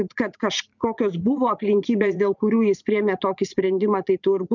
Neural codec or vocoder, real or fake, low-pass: vocoder, 44.1 kHz, 80 mel bands, Vocos; fake; 7.2 kHz